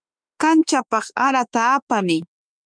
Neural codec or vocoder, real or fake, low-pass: autoencoder, 48 kHz, 32 numbers a frame, DAC-VAE, trained on Japanese speech; fake; 9.9 kHz